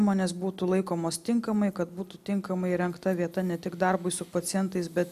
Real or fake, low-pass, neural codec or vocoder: real; 14.4 kHz; none